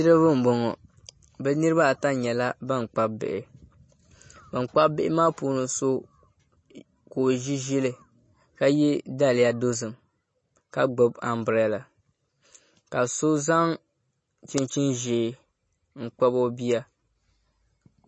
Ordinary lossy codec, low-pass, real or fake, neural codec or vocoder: MP3, 32 kbps; 9.9 kHz; real; none